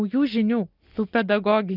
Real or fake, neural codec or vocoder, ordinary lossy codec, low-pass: real; none; Opus, 32 kbps; 5.4 kHz